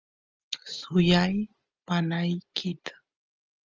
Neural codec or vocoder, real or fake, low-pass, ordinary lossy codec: none; real; 7.2 kHz; Opus, 24 kbps